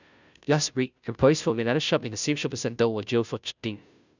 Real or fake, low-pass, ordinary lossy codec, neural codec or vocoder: fake; 7.2 kHz; none; codec, 16 kHz, 0.5 kbps, FunCodec, trained on Chinese and English, 25 frames a second